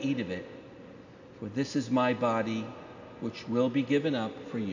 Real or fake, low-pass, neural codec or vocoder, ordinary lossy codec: real; 7.2 kHz; none; AAC, 48 kbps